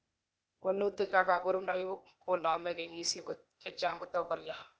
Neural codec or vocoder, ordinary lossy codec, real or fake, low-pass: codec, 16 kHz, 0.8 kbps, ZipCodec; none; fake; none